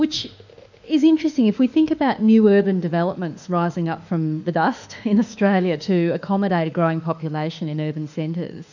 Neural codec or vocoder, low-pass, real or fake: autoencoder, 48 kHz, 32 numbers a frame, DAC-VAE, trained on Japanese speech; 7.2 kHz; fake